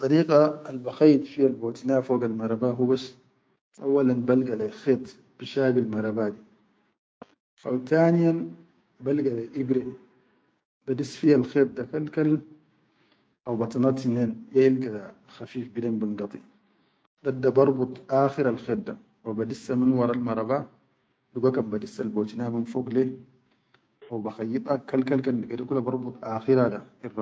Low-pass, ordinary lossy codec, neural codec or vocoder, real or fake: none; none; codec, 16 kHz, 6 kbps, DAC; fake